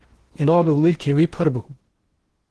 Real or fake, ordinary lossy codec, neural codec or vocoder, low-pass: fake; Opus, 16 kbps; codec, 16 kHz in and 24 kHz out, 0.8 kbps, FocalCodec, streaming, 65536 codes; 10.8 kHz